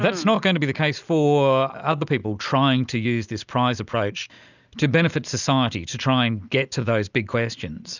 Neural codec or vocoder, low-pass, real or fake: none; 7.2 kHz; real